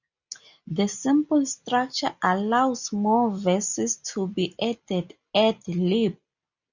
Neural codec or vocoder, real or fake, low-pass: none; real; 7.2 kHz